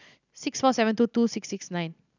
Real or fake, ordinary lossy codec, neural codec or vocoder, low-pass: real; none; none; 7.2 kHz